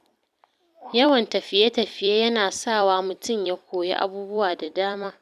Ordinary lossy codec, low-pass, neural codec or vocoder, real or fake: none; 14.4 kHz; vocoder, 44.1 kHz, 128 mel bands every 256 samples, BigVGAN v2; fake